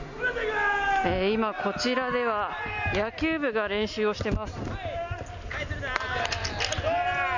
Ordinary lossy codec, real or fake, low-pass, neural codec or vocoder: none; real; 7.2 kHz; none